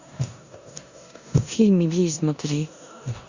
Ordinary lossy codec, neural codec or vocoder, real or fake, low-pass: Opus, 64 kbps; codec, 16 kHz in and 24 kHz out, 0.9 kbps, LongCat-Audio-Codec, four codebook decoder; fake; 7.2 kHz